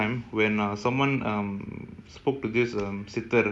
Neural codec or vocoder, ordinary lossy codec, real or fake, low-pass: none; none; real; none